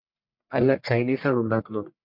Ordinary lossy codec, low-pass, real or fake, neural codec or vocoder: MP3, 48 kbps; 5.4 kHz; fake; codec, 44.1 kHz, 1.7 kbps, Pupu-Codec